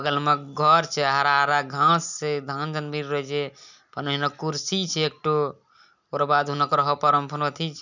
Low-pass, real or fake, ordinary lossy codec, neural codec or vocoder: 7.2 kHz; real; none; none